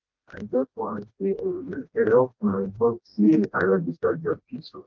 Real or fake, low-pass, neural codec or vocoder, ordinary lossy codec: fake; 7.2 kHz; codec, 16 kHz, 1 kbps, FreqCodec, smaller model; Opus, 32 kbps